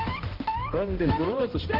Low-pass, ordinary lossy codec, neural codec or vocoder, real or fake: 5.4 kHz; Opus, 16 kbps; codec, 16 kHz, 0.5 kbps, X-Codec, HuBERT features, trained on balanced general audio; fake